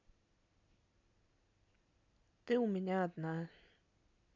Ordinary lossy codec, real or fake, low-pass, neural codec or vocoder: AAC, 48 kbps; real; 7.2 kHz; none